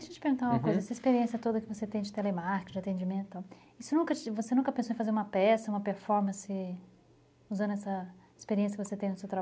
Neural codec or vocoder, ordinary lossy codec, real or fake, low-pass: none; none; real; none